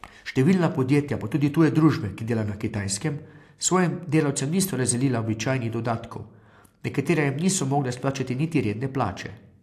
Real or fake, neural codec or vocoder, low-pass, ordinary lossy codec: real; none; 14.4 kHz; AAC, 64 kbps